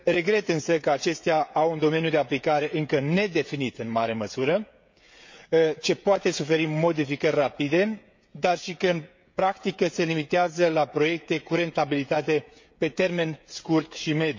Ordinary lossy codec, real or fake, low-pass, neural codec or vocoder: MP3, 48 kbps; fake; 7.2 kHz; codec, 16 kHz, 16 kbps, FreqCodec, smaller model